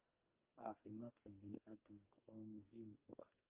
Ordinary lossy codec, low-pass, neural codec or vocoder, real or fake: Opus, 24 kbps; 3.6 kHz; codec, 44.1 kHz, 2.6 kbps, SNAC; fake